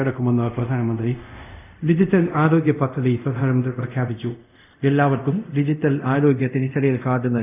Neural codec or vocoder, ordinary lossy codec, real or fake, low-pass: codec, 24 kHz, 0.5 kbps, DualCodec; none; fake; 3.6 kHz